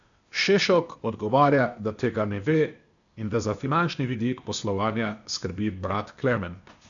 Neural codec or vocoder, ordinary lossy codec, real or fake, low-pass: codec, 16 kHz, 0.8 kbps, ZipCodec; MP3, 96 kbps; fake; 7.2 kHz